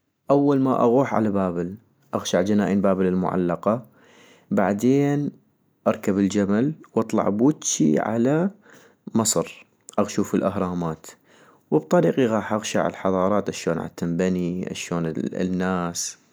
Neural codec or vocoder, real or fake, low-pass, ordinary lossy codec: none; real; none; none